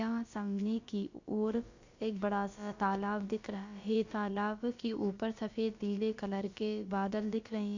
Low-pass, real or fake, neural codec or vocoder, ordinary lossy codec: 7.2 kHz; fake; codec, 16 kHz, about 1 kbps, DyCAST, with the encoder's durations; Opus, 64 kbps